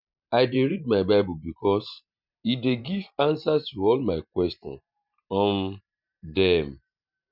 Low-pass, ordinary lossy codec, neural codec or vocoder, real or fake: 5.4 kHz; none; vocoder, 44.1 kHz, 128 mel bands every 512 samples, BigVGAN v2; fake